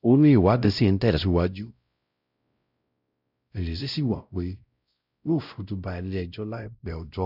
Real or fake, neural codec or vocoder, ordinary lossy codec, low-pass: fake; codec, 16 kHz, 0.5 kbps, X-Codec, WavLM features, trained on Multilingual LibriSpeech; none; 5.4 kHz